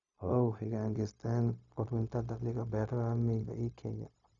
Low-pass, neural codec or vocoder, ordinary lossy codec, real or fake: 7.2 kHz; codec, 16 kHz, 0.4 kbps, LongCat-Audio-Codec; none; fake